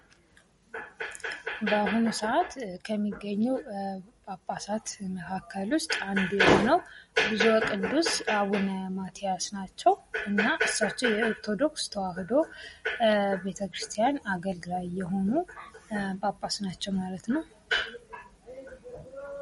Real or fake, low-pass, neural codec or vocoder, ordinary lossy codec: real; 19.8 kHz; none; MP3, 48 kbps